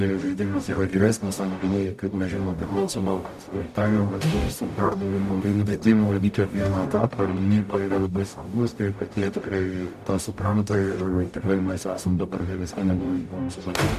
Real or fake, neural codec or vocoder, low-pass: fake; codec, 44.1 kHz, 0.9 kbps, DAC; 14.4 kHz